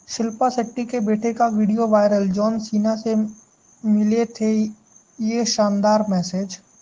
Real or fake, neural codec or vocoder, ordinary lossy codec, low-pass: real; none; Opus, 16 kbps; 7.2 kHz